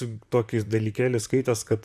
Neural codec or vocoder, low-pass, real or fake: vocoder, 44.1 kHz, 128 mel bands, Pupu-Vocoder; 14.4 kHz; fake